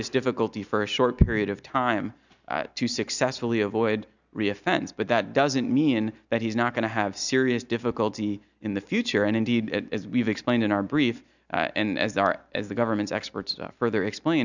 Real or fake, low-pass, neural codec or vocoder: real; 7.2 kHz; none